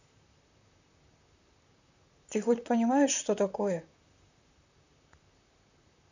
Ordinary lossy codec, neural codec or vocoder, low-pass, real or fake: none; vocoder, 44.1 kHz, 128 mel bands, Pupu-Vocoder; 7.2 kHz; fake